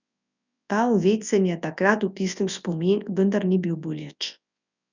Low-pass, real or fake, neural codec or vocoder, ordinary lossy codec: 7.2 kHz; fake; codec, 24 kHz, 0.9 kbps, WavTokenizer, large speech release; none